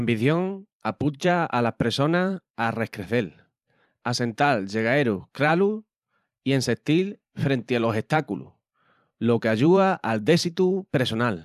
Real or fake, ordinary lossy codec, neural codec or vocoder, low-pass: fake; none; vocoder, 44.1 kHz, 128 mel bands every 512 samples, BigVGAN v2; 14.4 kHz